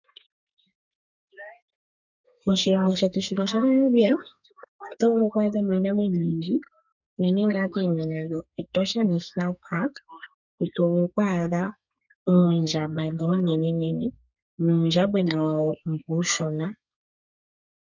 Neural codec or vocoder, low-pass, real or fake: codec, 32 kHz, 1.9 kbps, SNAC; 7.2 kHz; fake